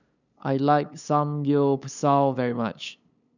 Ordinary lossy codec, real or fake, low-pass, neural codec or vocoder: none; fake; 7.2 kHz; codec, 16 kHz, 8 kbps, FunCodec, trained on LibriTTS, 25 frames a second